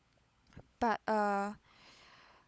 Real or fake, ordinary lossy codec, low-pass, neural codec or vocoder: fake; none; none; codec, 16 kHz, 16 kbps, FunCodec, trained on LibriTTS, 50 frames a second